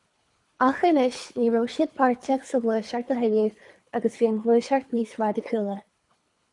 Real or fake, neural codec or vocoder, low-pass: fake; codec, 24 kHz, 3 kbps, HILCodec; 10.8 kHz